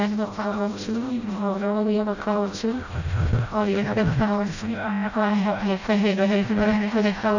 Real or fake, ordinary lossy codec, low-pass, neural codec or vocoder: fake; none; 7.2 kHz; codec, 16 kHz, 0.5 kbps, FreqCodec, smaller model